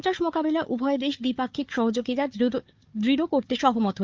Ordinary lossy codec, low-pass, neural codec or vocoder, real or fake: Opus, 24 kbps; 7.2 kHz; codec, 16 kHz, 4 kbps, FunCodec, trained on Chinese and English, 50 frames a second; fake